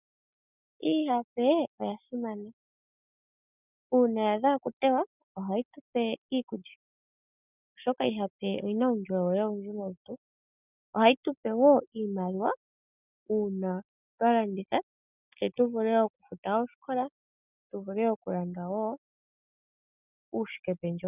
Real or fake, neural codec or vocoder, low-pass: real; none; 3.6 kHz